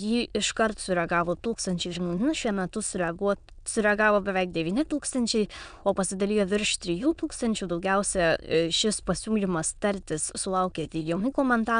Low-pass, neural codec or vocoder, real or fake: 9.9 kHz; autoencoder, 22.05 kHz, a latent of 192 numbers a frame, VITS, trained on many speakers; fake